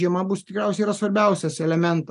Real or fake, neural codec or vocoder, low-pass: real; none; 10.8 kHz